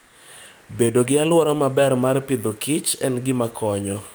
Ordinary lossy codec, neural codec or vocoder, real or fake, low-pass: none; codec, 44.1 kHz, 7.8 kbps, DAC; fake; none